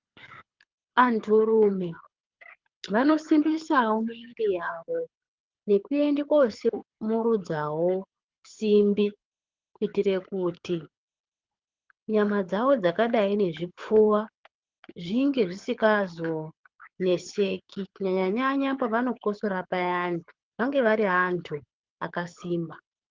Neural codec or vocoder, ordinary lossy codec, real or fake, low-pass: codec, 24 kHz, 6 kbps, HILCodec; Opus, 32 kbps; fake; 7.2 kHz